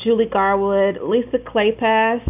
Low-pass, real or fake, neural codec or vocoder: 3.6 kHz; real; none